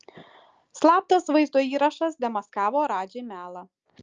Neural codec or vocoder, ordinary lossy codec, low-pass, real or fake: none; Opus, 24 kbps; 7.2 kHz; real